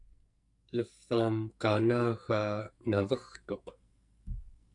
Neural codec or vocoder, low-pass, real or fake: codec, 44.1 kHz, 2.6 kbps, SNAC; 10.8 kHz; fake